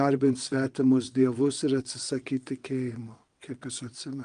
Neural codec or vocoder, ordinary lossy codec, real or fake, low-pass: vocoder, 22.05 kHz, 80 mel bands, WaveNeXt; Opus, 32 kbps; fake; 9.9 kHz